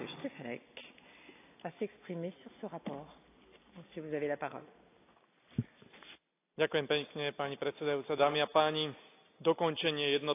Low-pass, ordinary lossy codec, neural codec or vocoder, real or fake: 3.6 kHz; AAC, 24 kbps; none; real